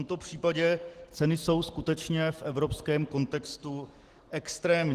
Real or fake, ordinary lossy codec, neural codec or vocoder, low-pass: real; Opus, 16 kbps; none; 14.4 kHz